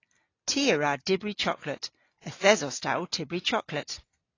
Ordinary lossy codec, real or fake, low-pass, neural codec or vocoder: AAC, 32 kbps; real; 7.2 kHz; none